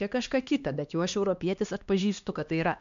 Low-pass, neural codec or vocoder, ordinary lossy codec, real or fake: 7.2 kHz; codec, 16 kHz, 2 kbps, X-Codec, HuBERT features, trained on LibriSpeech; MP3, 64 kbps; fake